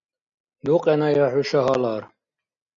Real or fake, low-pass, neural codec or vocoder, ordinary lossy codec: real; 7.2 kHz; none; MP3, 64 kbps